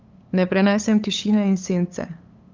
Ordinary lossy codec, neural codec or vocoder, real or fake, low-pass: Opus, 24 kbps; codec, 16 kHz, 8 kbps, FunCodec, trained on LibriTTS, 25 frames a second; fake; 7.2 kHz